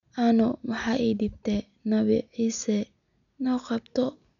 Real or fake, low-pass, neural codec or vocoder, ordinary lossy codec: real; 7.2 kHz; none; none